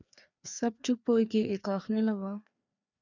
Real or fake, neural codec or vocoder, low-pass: fake; codec, 16 kHz, 2 kbps, FreqCodec, larger model; 7.2 kHz